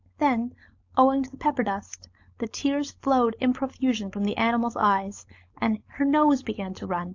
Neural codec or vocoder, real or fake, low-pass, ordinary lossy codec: codec, 16 kHz, 4.8 kbps, FACodec; fake; 7.2 kHz; AAC, 48 kbps